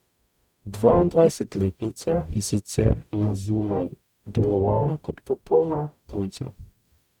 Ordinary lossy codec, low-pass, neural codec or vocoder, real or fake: none; 19.8 kHz; codec, 44.1 kHz, 0.9 kbps, DAC; fake